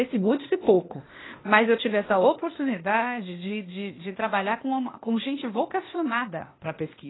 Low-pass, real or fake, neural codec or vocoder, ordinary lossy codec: 7.2 kHz; fake; codec, 16 kHz, 0.8 kbps, ZipCodec; AAC, 16 kbps